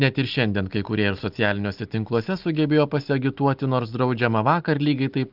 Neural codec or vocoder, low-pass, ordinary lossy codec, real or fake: none; 5.4 kHz; Opus, 32 kbps; real